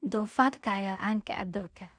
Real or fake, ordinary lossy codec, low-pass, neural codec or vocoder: fake; none; 9.9 kHz; codec, 16 kHz in and 24 kHz out, 0.4 kbps, LongCat-Audio-Codec, two codebook decoder